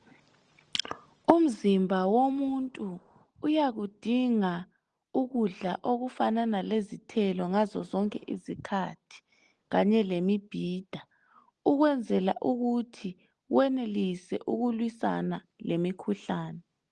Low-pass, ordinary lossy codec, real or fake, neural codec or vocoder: 9.9 kHz; Opus, 24 kbps; real; none